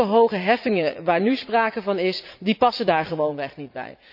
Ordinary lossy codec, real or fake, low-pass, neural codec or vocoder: none; fake; 5.4 kHz; vocoder, 44.1 kHz, 80 mel bands, Vocos